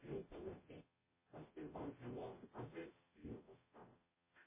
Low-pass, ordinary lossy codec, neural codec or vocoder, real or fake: 3.6 kHz; MP3, 16 kbps; codec, 44.1 kHz, 0.9 kbps, DAC; fake